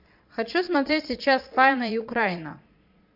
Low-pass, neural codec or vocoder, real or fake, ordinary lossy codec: 5.4 kHz; vocoder, 22.05 kHz, 80 mel bands, Vocos; fake; AAC, 48 kbps